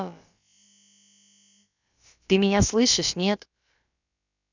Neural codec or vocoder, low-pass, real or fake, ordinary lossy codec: codec, 16 kHz, about 1 kbps, DyCAST, with the encoder's durations; 7.2 kHz; fake; none